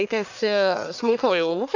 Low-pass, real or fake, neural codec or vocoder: 7.2 kHz; fake; codec, 44.1 kHz, 1.7 kbps, Pupu-Codec